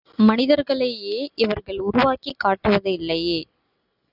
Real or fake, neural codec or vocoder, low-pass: real; none; 5.4 kHz